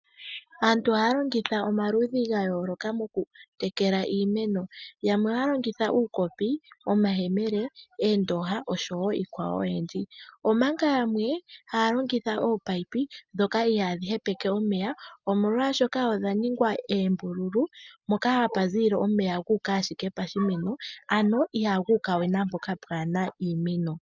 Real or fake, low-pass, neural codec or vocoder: real; 7.2 kHz; none